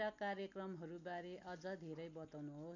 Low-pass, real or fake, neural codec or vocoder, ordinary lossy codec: 7.2 kHz; real; none; none